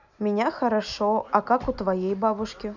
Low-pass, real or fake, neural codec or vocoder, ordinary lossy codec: 7.2 kHz; real; none; none